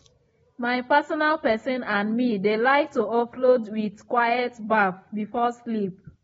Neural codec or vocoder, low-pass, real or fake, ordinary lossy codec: none; 19.8 kHz; real; AAC, 24 kbps